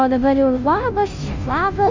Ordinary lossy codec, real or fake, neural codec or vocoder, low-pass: MP3, 64 kbps; fake; codec, 16 kHz, 0.5 kbps, FunCodec, trained on Chinese and English, 25 frames a second; 7.2 kHz